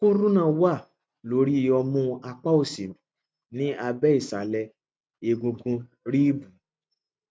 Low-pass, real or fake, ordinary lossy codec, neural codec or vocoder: none; real; none; none